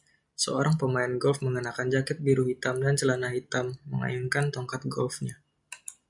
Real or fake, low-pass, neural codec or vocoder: real; 10.8 kHz; none